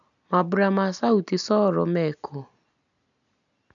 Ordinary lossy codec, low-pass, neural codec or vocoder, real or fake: none; 7.2 kHz; none; real